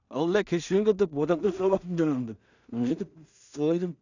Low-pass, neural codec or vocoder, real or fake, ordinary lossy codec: 7.2 kHz; codec, 16 kHz in and 24 kHz out, 0.4 kbps, LongCat-Audio-Codec, two codebook decoder; fake; none